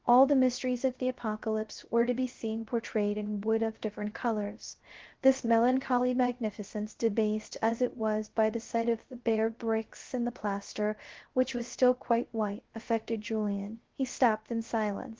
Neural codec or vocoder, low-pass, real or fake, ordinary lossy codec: codec, 16 kHz, 0.3 kbps, FocalCodec; 7.2 kHz; fake; Opus, 16 kbps